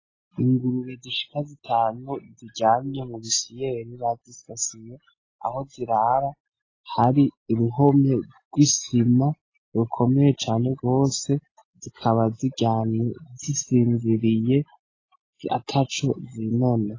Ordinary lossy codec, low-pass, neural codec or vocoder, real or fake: AAC, 32 kbps; 7.2 kHz; none; real